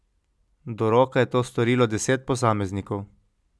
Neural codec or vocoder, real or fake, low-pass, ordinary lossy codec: none; real; none; none